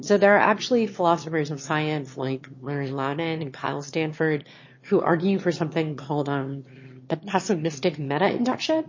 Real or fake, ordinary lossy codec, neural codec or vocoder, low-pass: fake; MP3, 32 kbps; autoencoder, 22.05 kHz, a latent of 192 numbers a frame, VITS, trained on one speaker; 7.2 kHz